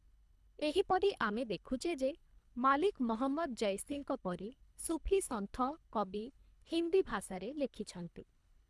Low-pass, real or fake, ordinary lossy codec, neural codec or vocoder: none; fake; none; codec, 24 kHz, 3 kbps, HILCodec